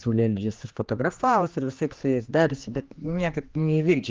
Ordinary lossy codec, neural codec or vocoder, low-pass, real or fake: Opus, 24 kbps; codec, 16 kHz, 2 kbps, X-Codec, HuBERT features, trained on general audio; 7.2 kHz; fake